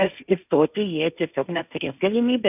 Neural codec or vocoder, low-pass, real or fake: codec, 16 kHz, 1.1 kbps, Voila-Tokenizer; 3.6 kHz; fake